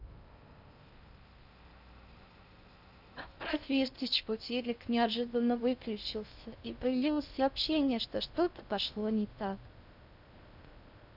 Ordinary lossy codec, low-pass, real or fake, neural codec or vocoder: none; 5.4 kHz; fake; codec, 16 kHz in and 24 kHz out, 0.6 kbps, FocalCodec, streaming, 2048 codes